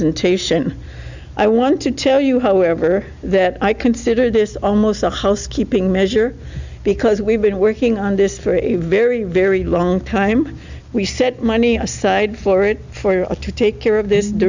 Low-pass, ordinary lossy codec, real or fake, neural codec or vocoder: 7.2 kHz; Opus, 64 kbps; real; none